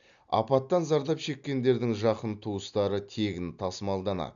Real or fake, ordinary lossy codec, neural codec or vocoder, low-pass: real; none; none; 7.2 kHz